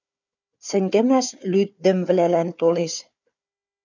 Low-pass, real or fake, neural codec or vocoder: 7.2 kHz; fake; codec, 16 kHz, 4 kbps, FunCodec, trained on Chinese and English, 50 frames a second